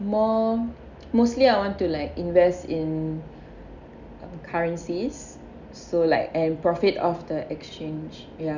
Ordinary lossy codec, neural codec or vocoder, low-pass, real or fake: none; none; 7.2 kHz; real